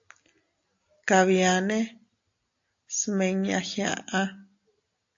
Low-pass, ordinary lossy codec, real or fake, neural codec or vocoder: 7.2 kHz; AAC, 48 kbps; real; none